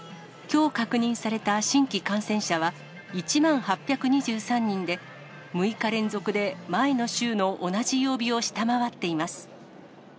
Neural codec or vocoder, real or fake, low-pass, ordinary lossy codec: none; real; none; none